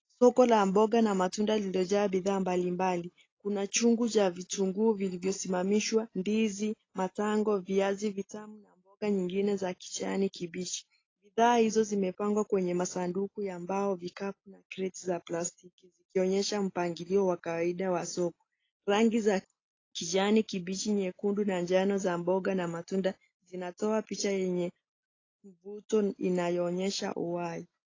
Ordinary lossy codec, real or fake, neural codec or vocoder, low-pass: AAC, 32 kbps; real; none; 7.2 kHz